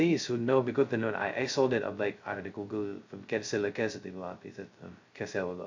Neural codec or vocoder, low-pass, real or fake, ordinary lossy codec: codec, 16 kHz, 0.2 kbps, FocalCodec; 7.2 kHz; fake; AAC, 48 kbps